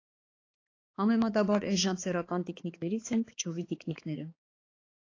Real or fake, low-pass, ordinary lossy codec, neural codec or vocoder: fake; 7.2 kHz; AAC, 32 kbps; codec, 16 kHz, 4 kbps, X-Codec, HuBERT features, trained on balanced general audio